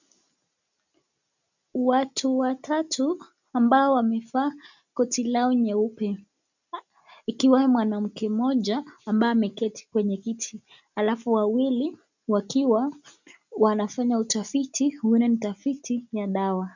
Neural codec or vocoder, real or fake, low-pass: none; real; 7.2 kHz